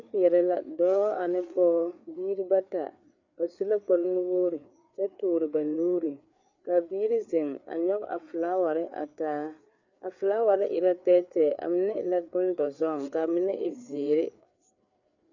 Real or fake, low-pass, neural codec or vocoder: fake; 7.2 kHz; codec, 16 kHz, 4 kbps, FreqCodec, larger model